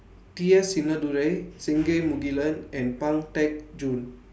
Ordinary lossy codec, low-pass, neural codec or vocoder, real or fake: none; none; none; real